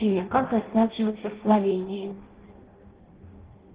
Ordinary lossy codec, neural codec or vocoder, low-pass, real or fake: Opus, 16 kbps; codec, 16 kHz in and 24 kHz out, 0.6 kbps, FireRedTTS-2 codec; 3.6 kHz; fake